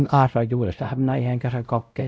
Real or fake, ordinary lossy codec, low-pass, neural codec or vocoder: fake; none; none; codec, 16 kHz, 0.5 kbps, X-Codec, WavLM features, trained on Multilingual LibriSpeech